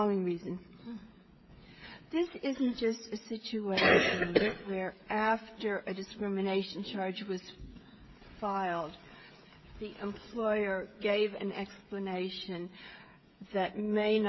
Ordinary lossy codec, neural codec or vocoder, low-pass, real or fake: MP3, 24 kbps; codec, 16 kHz, 16 kbps, FreqCodec, smaller model; 7.2 kHz; fake